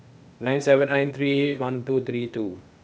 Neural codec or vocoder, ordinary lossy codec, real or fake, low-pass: codec, 16 kHz, 0.8 kbps, ZipCodec; none; fake; none